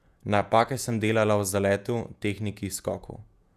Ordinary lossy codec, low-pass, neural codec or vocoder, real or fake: none; 14.4 kHz; vocoder, 48 kHz, 128 mel bands, Vocos; fake